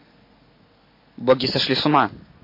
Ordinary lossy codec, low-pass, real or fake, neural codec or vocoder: MP3, 24 kbps; 5.4 kHz; real; none